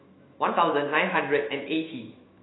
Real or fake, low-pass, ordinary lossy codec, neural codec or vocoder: fake; 7.2 kHz; AAC, 16 kbps; autoencoder, 48 kHz, 128 numbers a frame, DAC-VAE, trained on Japanese speech